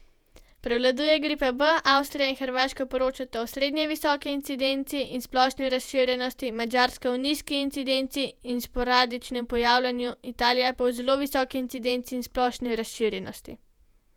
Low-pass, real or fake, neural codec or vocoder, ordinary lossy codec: 19.8 kHz; fake; vocoder, 48 kHz, 128 mel bands, Vocos; none